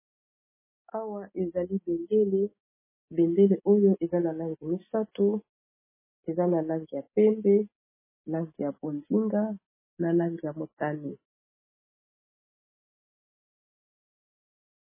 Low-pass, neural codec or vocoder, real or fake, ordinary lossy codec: 3.6 kHz; none; real; MP3, 16 kbps